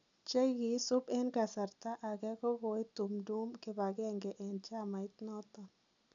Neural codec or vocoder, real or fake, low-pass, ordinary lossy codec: none; real; 7.2 kHz; none